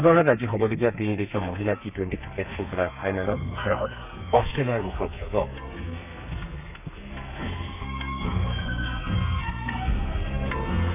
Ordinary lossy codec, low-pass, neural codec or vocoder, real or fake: none; 3.6 kHz; codec, 32 kHz, 1.9 kbps, SNAC; fake